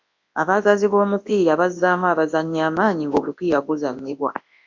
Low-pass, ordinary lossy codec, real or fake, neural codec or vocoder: 7.2 kHz; AAC, 48 kbps; fake; codec, 24 kHz, 0.9 kbps, WavTokenizer, large speech release